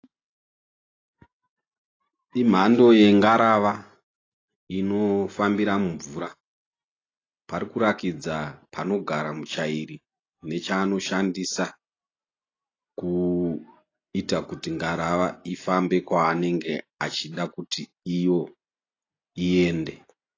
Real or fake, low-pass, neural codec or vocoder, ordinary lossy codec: real; 7.2 kHz; none; AAC, 32 kbps